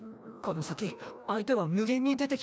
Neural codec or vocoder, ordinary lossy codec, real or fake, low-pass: codec, 16 kHz, 1 kbps, FreqCodec, larger model; none; fake; none